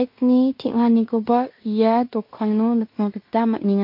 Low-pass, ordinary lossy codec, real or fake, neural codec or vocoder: 5.4 kHz; MP3, 32 kbps; fake; codec, 16 kHz in and 24 kHz out, 0.9 kbps, LongCat-Audio-Codec, fine tuned four codebook decoder